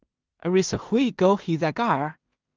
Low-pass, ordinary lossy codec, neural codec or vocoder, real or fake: 7.2 kHz; Opus, 24 kbps; codec, 16 kHz in and 24 kHz out, 0.4 kbps, LongCat-Audio-Codec, two codebook decoder; fake